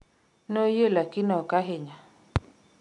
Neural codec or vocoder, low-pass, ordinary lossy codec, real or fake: none; 10.8 kHz; none; real